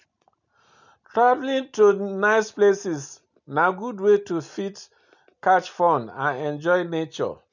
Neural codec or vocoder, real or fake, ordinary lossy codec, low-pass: none; real; none; 7.2 kHz